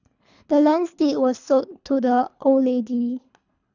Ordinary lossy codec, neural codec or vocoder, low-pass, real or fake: none; codec, 24 kHz, 3 kbps, HILCodec; 7.2 kHz; fake